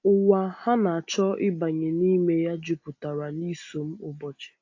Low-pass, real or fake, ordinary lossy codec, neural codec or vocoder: 7.2 kHz; real; AAC, 48 kbps; none